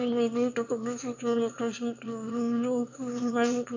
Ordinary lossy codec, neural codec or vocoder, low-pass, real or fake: MP3, 48 kbps; autoencoder, 22.05 kHz, a latent of 192 numbers a frame, VITS, trained on one speaker; 7.2 kHz; fake